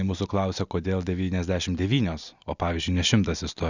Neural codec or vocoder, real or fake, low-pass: none; real; 7.2 kHz